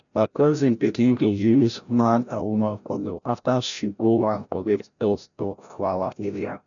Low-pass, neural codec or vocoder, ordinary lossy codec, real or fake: 7.2 kHz; codec, 16 kHz, 0.5 kbps, FreqCodec, larger model; none; fake